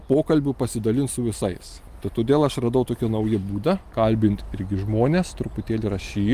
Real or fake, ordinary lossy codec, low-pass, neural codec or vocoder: real; Opus, 32 kbps; 14.4 kHz; none